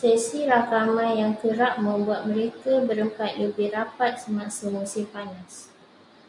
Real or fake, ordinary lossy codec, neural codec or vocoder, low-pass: real; MP3, 64 kbps; none; 10.8 kHz